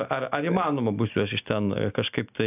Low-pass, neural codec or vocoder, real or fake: 3.6 kHz; none; real